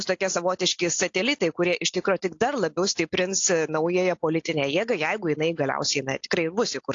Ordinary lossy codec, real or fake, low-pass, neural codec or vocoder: AAC, 48 kbps; real; 7.2 kHz; none